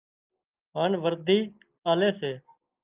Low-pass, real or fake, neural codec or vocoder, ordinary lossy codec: 3.6 kHz; real; none; Opus, 24 kbps